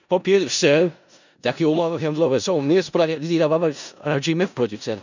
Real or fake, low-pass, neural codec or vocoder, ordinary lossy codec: fake; 7.2 kHz; codec, 16 kHz in and 24 kHz out, 0.4 kbps, LongCat-Audio-Codec, four codebook decoder; none